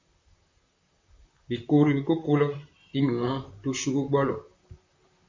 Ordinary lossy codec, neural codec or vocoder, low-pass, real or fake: MP3, 48 kbps; vocoder, 44.1 kHz, 128 mel bands, Pupu-Vocoder; 7.2 kHz; fake